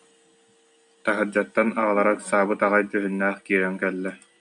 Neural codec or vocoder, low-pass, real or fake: none; 9.9 kHz; real